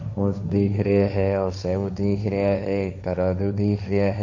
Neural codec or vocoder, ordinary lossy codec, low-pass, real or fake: codec, 16 kHz, 1.1 kbps, Voila-Tokenizer; none; 7.2 kHz; fake